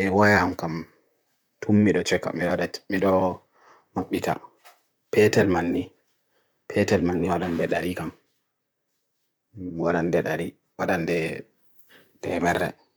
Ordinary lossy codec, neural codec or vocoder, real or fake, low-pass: none; vocoder, 44.1 kHz, 128 mel bands, Pupu-Vocoder; fake; none